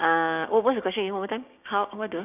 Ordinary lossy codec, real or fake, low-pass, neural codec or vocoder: none; fake; 3.6 kHz; codec, 16 kHz, 6 kbps, DAC